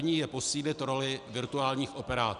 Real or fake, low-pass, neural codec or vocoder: real; 10.8 kHz; none